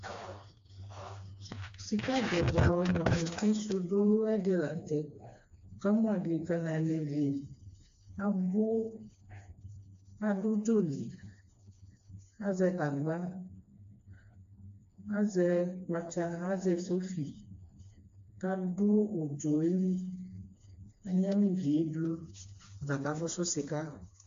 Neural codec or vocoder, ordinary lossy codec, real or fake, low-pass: codec, 16 kHz, 2 kbps, FreqCodec, smaller model; AAC, 48 kbps; fake; 7.2 kHz